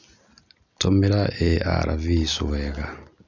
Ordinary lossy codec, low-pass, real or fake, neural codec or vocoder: none; 7.2 kHz; real; none